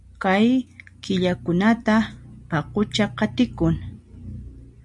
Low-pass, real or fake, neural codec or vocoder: 10.8 kHz; real; none